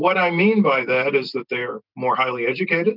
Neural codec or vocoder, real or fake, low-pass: none; real; 5.4 kHz